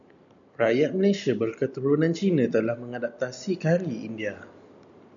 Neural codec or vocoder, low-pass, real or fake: none; 7.2 kHz; real